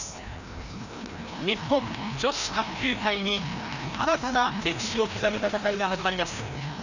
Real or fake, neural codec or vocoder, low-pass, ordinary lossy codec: fake; codec, 16 kHz, 1 kbps, FreqCodec, larger model; 7.2 kHz; none